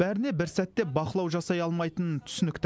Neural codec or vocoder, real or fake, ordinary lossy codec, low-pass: none; real; none; none